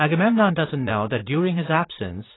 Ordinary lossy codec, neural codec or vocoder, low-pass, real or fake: AAC, 16 kbps; vocoder, 44.1 kHz, 80 mel bands, Vocos; 7.2 kHz; fake